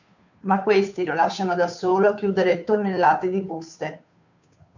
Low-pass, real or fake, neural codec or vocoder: 7.2 kHz; fake; codec, 16 kHz, 2 kbps, FunCodec, trained on Chinese and English, 25 frames a second